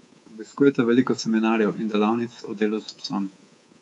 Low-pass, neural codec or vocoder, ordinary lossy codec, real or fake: 10.8 kHz; codec, 24 kHz, 3.1 kbps, DualCodec; none; fake